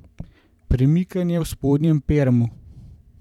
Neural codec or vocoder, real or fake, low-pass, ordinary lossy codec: vocoder, 44.1 kHz, 128 mel bands every 512 samples, BigVGAN v2; fake; 19.8 kHz; none